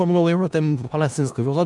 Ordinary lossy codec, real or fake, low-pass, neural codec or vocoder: MP3, 96 kbps; fake; 10.8 kHz; codec, 16 kHz in and 24 kHz out, 0.4 kbps, LongCat-Audio-Codec, four codebook decoder